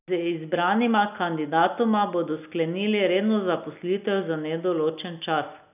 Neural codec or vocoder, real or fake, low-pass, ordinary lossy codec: none; real; 3.6 kHz; none